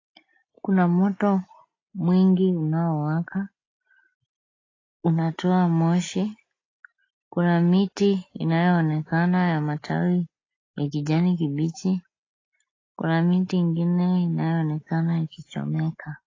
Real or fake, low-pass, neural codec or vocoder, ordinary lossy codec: real; 7.2 kHz; none; AAC, 32 kbps